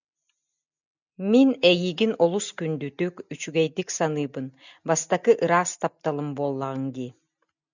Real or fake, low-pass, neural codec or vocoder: real; 7.2 kHz; none